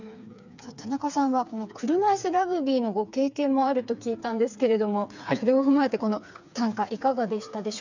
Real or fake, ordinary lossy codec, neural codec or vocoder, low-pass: fake; none; codec, 16 kHz, 4 kbps, FreqCodec, smaller model; 7.2 kHz